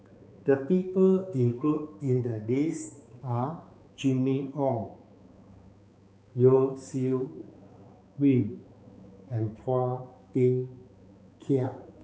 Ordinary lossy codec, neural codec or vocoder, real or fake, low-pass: none; codec, 16 kHz, 4 kbps, X-Codec, HuBERT features, trained on balanced general audio; fake; none